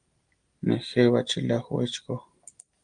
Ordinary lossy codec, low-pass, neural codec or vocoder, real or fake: Opus, 32 kbps; 9.9 kHz; none; real